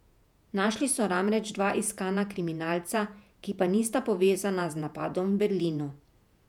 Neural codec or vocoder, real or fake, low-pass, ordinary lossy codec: vocoder, 48 kHz, 128 mel bands, Vocos; fake; 19.8 kHz; none